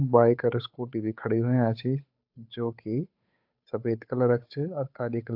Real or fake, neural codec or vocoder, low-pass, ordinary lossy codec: fake; codec, 16 kHz, 8 kbps, FunCodec, trained on Chinese and English, 25 frames a second; 5.4 kHz; AAC, 48 kbps